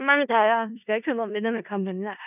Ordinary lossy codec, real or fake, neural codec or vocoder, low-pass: none; fake; codec, 16 kHz in and 24 kHz out, 0.4 kbps, LongCat-Audio-Codec, four codebook decoder; 3.6 kHz